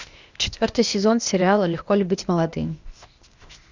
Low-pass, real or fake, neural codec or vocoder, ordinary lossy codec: 7.2 kHz; fake; codec, 16 kHz, 0.8 kbps, ZipCodec; Opus, 64 kbps